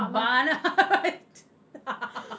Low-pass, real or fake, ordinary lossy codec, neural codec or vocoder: none; real; none; none